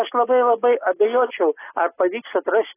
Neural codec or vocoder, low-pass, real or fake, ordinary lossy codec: none; 3.6 kHz; real; AAC, 16 kbps